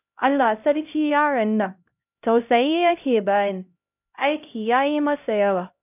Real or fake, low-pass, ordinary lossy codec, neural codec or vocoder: fake; 3.6 kHz; none; codec, 16 kHz, 0.5 kbps, X-Codec, HuBERT features, trained on LibriSpeech